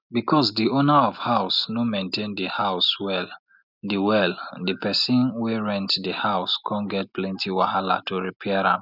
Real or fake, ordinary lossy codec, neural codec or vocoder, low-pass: real; none; none; 5.4 kHz